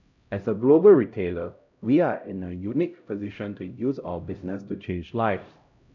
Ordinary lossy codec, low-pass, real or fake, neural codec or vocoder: none; 7.2 kHz; fake; codec, 16 kHz, 0.5 kbps, X-Codec, HuBERT features, trained on LibriSpeech